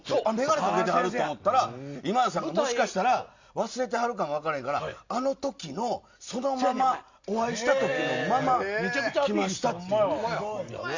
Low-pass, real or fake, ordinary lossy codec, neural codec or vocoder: 7.2 kHz; real; Opus, 64 kbps; none